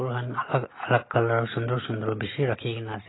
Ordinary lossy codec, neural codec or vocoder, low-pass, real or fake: AAC, 16 kbps; none; 7.2 kHz; real